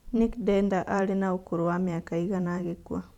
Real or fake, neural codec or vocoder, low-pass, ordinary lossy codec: fake; vocoder, 44.1 kHz, 128 mel bands every 512 samples, BigVGAN v2; 19.8 kHz; none